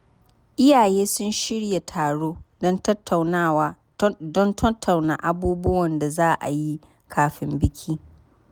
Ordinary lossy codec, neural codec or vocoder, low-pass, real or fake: none; none; none; real